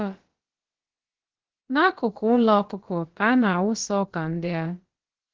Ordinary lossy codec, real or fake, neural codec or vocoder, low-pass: Opus, 16 kbps; fake; codec, 16 kHz, about 1 kbps, DyCAST, with the encoder's durations; 7.2 kHz